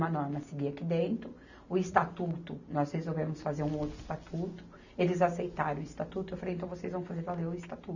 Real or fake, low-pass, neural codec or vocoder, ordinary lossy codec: real; 7.2 kHz; none; none